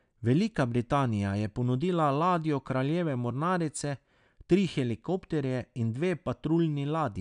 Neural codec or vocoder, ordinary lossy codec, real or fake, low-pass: none; none; real; 9.9 kHz